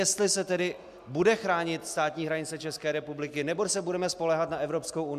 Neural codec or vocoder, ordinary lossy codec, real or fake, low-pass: none; MP3, 96 kbps; real; 14.4 kHz